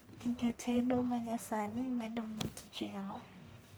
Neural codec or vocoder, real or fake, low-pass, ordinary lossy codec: codec, 44.1 kHz, 1.7 kbps, Pupu-Codec; fake; none; none